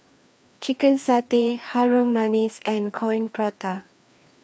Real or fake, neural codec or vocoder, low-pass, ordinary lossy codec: fake; codec, 16 kHz, 2 kbps, FreqCodec, larger model; none; none